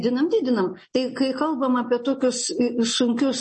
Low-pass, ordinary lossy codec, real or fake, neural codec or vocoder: 10.8 kHz; MP3, 32 kbps; real; none